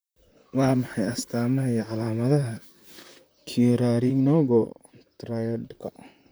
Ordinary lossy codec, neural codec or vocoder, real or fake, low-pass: none; vocoder, 44.1 kHz, 128 mel bands, Pupu-Vocoder; fake; none